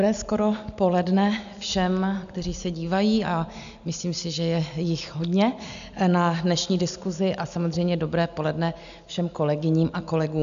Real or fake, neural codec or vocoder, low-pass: real; none; 7.2 kHz